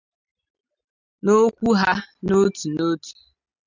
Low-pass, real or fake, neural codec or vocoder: 7.2 kHz; real; none